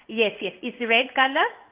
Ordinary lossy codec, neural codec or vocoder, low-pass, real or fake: Opus, 32 kbps; codec, 16 kHz in and 24 kHz out, 1 kbps, XY-Tokenizer; 3.6 kHz; fake